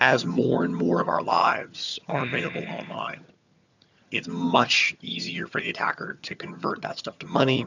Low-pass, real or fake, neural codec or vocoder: 7.2 kHz; fake; vocoder, 22.05 kHz, 80 mel bands, HiFi-GAN